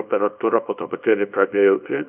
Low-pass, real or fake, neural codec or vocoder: 3.6 kHz; fake; codec, 24 kHz, 0.9 kbps, WavTokenizer, small release